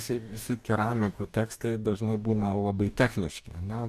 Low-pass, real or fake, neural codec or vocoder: 14.4 kHz; fake; codec, 44.1 kHz, 2.6 kbps, DAC